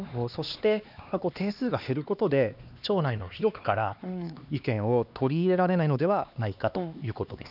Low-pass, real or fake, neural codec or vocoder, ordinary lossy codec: 5.4 kHz; fake; codec, 16 kHz, 2 kbps, X-Codec, HuBERT features, trained on LibriSpeech; none